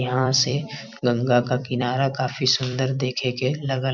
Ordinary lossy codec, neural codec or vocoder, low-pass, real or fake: none; vocoder, 44.1 kHz, 128 mel bands every 256 samples, BigVGAN v2; 7.2 kHz; fake